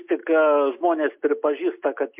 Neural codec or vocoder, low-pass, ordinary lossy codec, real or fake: none; 3.6 kHz; MP3, 32 kbps; real